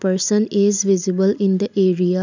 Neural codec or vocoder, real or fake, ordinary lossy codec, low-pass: none; real; none; 7.2 kHz